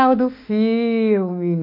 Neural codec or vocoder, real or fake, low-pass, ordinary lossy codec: autoencoder, 48 kHz, 32 numbers a frame, DAC-VAE, trained on Japanese speech; fake; 5.4 kHz; MP3, 32 kbps